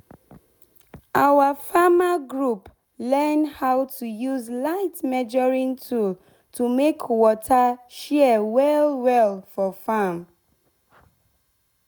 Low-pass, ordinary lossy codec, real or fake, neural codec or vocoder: none; none; real; none